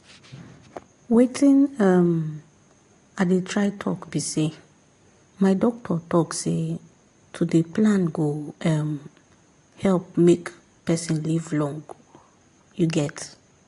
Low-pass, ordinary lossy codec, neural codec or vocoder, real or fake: 10.8 kHz; AAC, 48 kbps; none; real